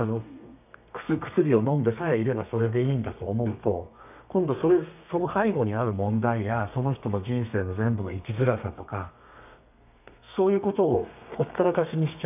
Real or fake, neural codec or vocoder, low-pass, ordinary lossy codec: fake; codec, 32 kHz, 1.9 kbps, SNAC; 3.6 kHz; none